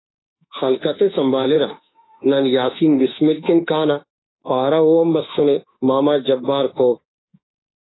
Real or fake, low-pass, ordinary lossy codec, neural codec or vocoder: fake; 7.2 kHz; AAC, 16 kbps; autoencoder, 48 kHz, 32 numbers a frame, DAC-VAE, trained on Japanese speech